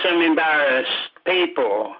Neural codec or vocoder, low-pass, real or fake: none; 5.4 kHz; real